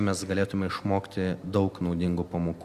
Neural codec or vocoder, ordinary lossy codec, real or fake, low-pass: none; Opus, 64 kbps; real; 14.4 kHz